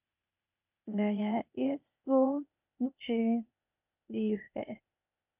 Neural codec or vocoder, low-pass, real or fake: codec, 16 kHz, 0.8 kbps, ZipCodec; 3.6 kHz; fake